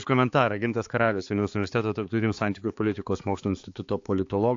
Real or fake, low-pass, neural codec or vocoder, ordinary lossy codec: fake; 7.2 kHz; codec, 16 kHz, 4 kbps, X-Codec, HuBERT features, trained on balanced general audio; AAC, 48 kbps